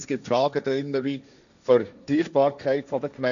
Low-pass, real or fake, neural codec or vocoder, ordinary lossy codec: 7.2 kHz; fake; codec, 16 kHz, 1.1 kbps, Voila-Tokenizer; none